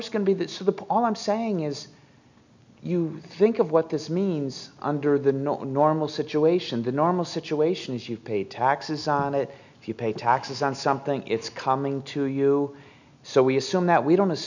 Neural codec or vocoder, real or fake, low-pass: none; real; 7.2 kHz